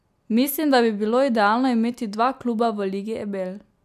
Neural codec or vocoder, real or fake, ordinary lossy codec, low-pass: none; real; none; 14.4 kHz